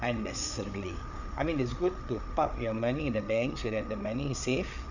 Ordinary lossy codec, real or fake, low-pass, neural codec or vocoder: none; fake; 7.2 kHz; codec, 16 kHz, 8 kbps, FreqCodec, larger model